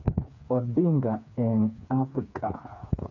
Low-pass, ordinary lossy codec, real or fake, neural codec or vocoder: 7.2 kHz; none; fake; codec, 16 kHz, 4 kbps, FreqCodec, smaller model